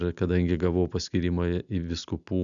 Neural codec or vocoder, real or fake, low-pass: none; real; 7.2 kHz